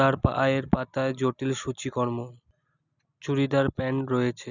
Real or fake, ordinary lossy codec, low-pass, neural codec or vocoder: real; none; 7.2 kHz; none